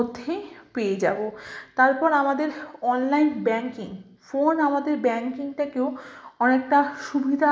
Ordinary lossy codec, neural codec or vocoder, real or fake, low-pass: none; none; real; none